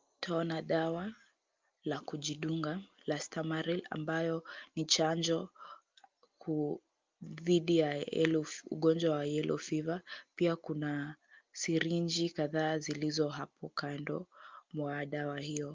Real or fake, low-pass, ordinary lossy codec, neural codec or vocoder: real; 7.2 kHz; Opus, 24 kbps; none